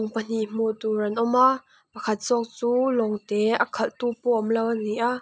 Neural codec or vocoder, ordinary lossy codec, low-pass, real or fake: none; none; none; real